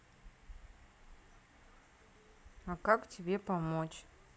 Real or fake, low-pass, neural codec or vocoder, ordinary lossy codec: real; none; none; none